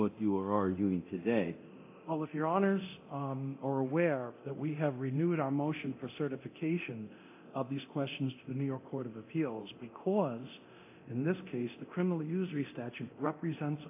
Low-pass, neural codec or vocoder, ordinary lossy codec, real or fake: 3.6 kHz; codec, 24 kHz, 0.9 kbps, DualCodec; AAC, 24 kbps; fake